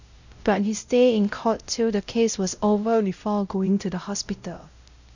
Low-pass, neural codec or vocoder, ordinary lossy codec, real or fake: 7.2 kHz; codec, 16 kHz, 0.5 kbps, X-Codec, WavLM features, trained on Multilingual LibriSpeech; none; fake